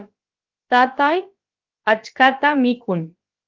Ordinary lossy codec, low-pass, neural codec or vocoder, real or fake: Opus, 32 kbps; 7.2 kHz; codec, 16 kHz, about 1 kbps, DyCAST, with the encoder's durations; fake